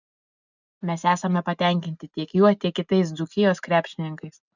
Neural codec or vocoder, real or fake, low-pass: none; real; 7.2 kHz